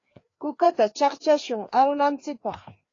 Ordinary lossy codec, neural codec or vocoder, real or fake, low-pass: AAC, 32 kbps; codec, 16 kHz, 2 kbps, FreqCodec, larger model; fake; 7.2 kHz